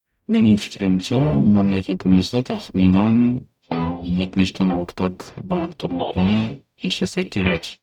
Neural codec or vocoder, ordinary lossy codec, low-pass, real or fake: codec, 44.1 kHz, 0.9 kbps, DAC; none; 19.8 kHz; fake